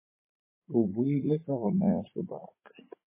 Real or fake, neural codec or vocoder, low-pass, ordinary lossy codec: fake; vocoder, 44.1 kHz, 80 mel bands, Vocos; 3.6 kHz; MP3, 16 kbps